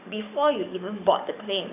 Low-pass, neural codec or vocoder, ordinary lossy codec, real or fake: 3.6 kHz; codec, 44.1 kHz, 7.8 kbps, DAC; none; fake